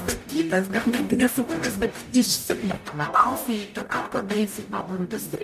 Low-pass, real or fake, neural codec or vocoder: 14.4 kHz; fake; codec, 44.1 kHz, 0.9 kbps, DAC